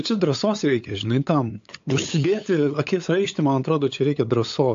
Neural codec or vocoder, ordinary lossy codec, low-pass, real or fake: codec, 16 kHz, 8 kbps, FunCodec, trained on LibriTTS, 25 frames a second; AAC, 48 kbps; 7.2 kHz; fake